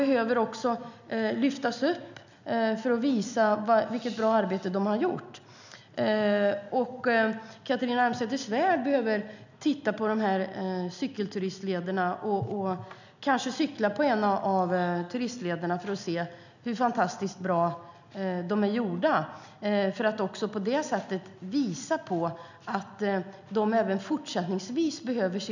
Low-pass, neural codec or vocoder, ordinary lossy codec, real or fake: 7.2 kHz; none; none; real